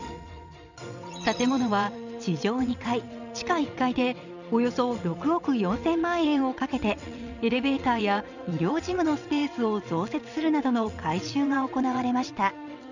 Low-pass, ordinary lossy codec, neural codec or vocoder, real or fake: 7.2 kHz; none; vocoder, 22.05 kHz, 80 mel bands, WaveNeXt; fake